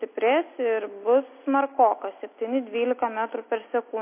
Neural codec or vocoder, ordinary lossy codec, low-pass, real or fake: none; MP3, 24 kbps; 3.6 kHz; real